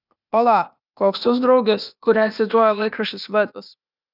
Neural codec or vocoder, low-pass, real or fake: codec, 16 kHz, 0.8 kbps, ZipCodec; 5.4 kHz; fake